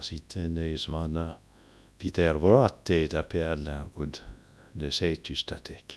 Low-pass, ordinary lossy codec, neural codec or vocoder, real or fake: none; none; codec, 24 kHz, 0.9 kbps, WavTokenizer, large speech release; fake